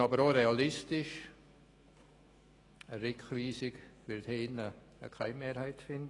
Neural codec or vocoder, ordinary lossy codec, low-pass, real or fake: autoencoder, 48 kHz, 128 numbers a frame, DAC-VAE, trained on Japanese speech; AAC, 32 kbps; 10.8 kHz; fake